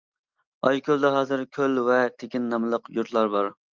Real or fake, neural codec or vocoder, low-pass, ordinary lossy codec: real; none; 7.2 kHz; Opus, 32 kbps